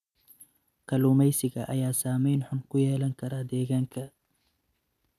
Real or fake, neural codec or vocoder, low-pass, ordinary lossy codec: real; none; 14.4 kHz; none